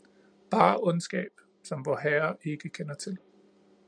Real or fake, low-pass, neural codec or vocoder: real; 9.9 kHz; none